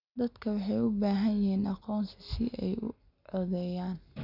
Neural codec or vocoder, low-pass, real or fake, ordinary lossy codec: none; 5.4 kHz; real; none